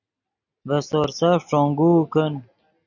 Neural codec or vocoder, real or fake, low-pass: none; real; 7.2 kHz